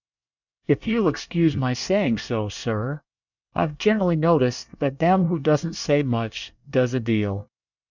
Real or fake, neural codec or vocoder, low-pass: fake; codec, 24 kHz, 1 kbps, SNAC; 7.2 kHz